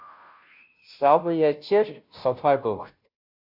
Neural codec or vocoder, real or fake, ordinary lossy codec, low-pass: codec, 16 kHz, 0.5 kbps, FunCodec, trained on Chinese and English, 25 frames a second; fake; AAC, 48 kbps; 5.4 kHz